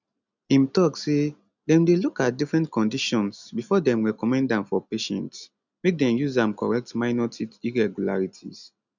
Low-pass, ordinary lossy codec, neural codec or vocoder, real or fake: 7.2 kHz; none; none; real